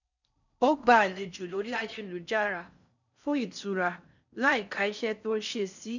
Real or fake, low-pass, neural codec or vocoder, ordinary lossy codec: fake; 7.2 kHz; codec, 16 kHz in and 24 kHz out, 0.6 kbps, FocalCodec, streaming, 4096 codes; none